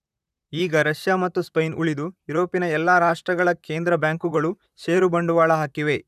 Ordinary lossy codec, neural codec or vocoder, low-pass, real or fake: none; vocoder, 44.1 kHz, 128 mel bands, Pupu-Vocoder; 14.4 kHz; fake